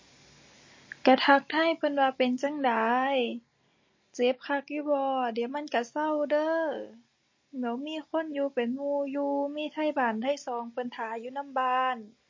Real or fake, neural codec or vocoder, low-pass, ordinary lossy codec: real; none; 7.2 kHz; MP3, 32 kbps